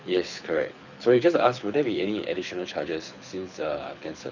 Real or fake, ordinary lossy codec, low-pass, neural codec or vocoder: fake; none; 7.2 kHz; codec, 24 kHz, 6 kbps, HILCodec